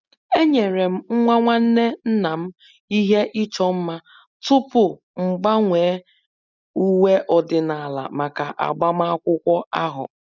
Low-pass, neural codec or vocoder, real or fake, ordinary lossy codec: 7.2 kHz; none; real; none